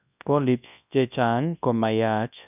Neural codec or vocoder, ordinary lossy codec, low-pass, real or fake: codec, 24 kHz, 0.9 kbps, WavTokenizer, large speech release; none; 3.6 kHz; fake